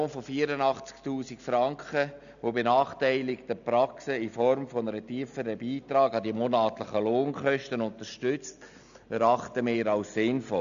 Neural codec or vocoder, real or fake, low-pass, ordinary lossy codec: none; real; 7.2 kHz; none